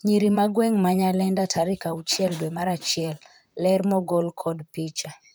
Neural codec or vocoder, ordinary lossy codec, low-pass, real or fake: vocoder, 44.1 kHz, 128 mel bands, Pupu-Vocoder; none; none; fake